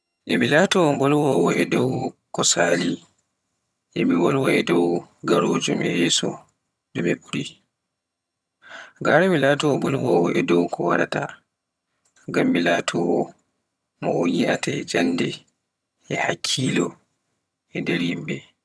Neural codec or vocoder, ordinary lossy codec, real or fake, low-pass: vocoder, 22.05 kHz, 80 mel bands, HiFi-GAN; none; fake; none